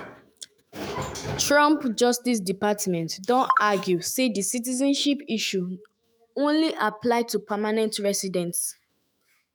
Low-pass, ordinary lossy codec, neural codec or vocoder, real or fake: none; none; autoencoder, 48 kHz, 128 numbers a frame, DAC-VAE, trained on Japanese speech; fake